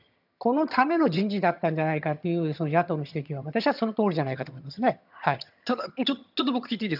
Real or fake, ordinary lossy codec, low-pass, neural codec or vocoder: fake; none; 5.4 kHz; vocoder, 22.05 kHz, 80 mel bands, HiFi-GAN